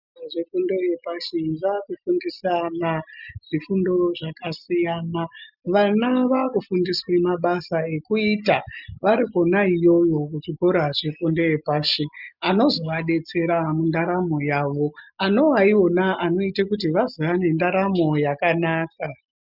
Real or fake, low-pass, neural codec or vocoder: real; 5.4 kHz; none